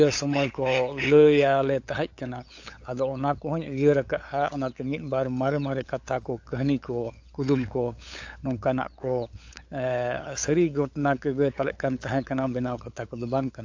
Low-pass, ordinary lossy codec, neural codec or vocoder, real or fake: 7.2 kHz; AAC, 48 kbps; codec, 16 kHz, 8 kbps, FunCodec, trained on LibriTTS, 25 frames a second; fake